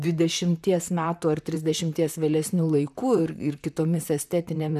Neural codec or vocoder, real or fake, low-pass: vocoder, 44.1 kHz, 128 mel bands, Pupu-Vocoder; fake; 14.4 kHz